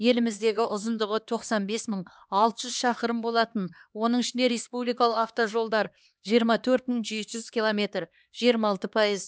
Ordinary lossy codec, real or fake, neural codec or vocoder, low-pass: none; fake; codec, 16 kHz, 2 kbps, X-Codec, HuBERT features, trained on LibriSpeech; none